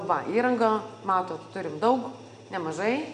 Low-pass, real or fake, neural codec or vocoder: 9.9 kHz; real; none